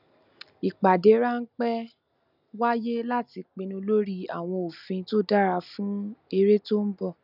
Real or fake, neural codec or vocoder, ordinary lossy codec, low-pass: real; none; none; 5.4 kHz